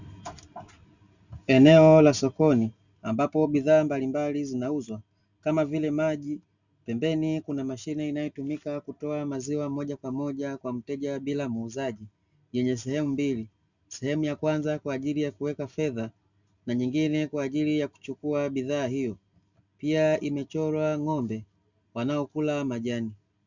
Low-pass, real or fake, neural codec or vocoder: 7.2 kHz; real; none